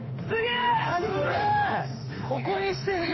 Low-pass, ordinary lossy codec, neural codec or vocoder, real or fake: 7.2 kHz; MP3, 24 kbps; autoencoder, 48 kHz, 32 numbers a frame, DAC-VAE, trained on Japanese speech; fake